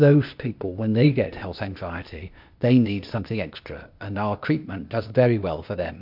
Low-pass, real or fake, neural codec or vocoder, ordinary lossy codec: 5.4 kHz; fake; codec, 16 kHz, 0.8 kbps, ZipCodec; MP3, 48 kbps